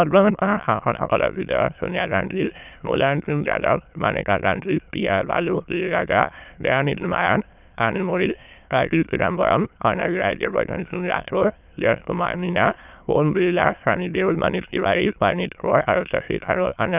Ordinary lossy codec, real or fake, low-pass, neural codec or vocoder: none; fake; 3.6 kHz; autoencoder, 22.05 kHz, a latent of 192 numbers a frame, VITS, trained on many speakers